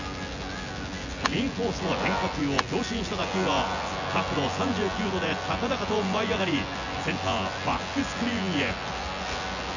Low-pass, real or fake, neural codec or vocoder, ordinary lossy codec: 7.2 kHz; fake; vocoder, 24 kHz, 100 mel bands, Vocos; none